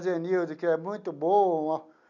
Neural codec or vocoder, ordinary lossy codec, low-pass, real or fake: none; none; 7.2 kHz; real